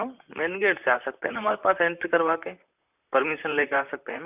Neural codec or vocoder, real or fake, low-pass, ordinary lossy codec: vocoder, 44.1 kHz, 128 mel bands, Pupu-Vocoder; fake; 3.6 kHz; none